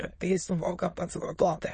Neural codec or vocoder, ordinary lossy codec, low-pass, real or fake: autoencoder, 22.05 kHz, a latent of 192 numbers a frame, VITS, trained on many speakers; MP3, 32 kbps; 9.9 kHz; fake